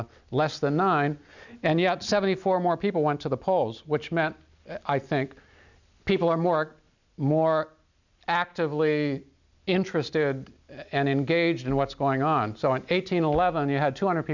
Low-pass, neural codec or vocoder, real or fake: 7.2 kHz; none; real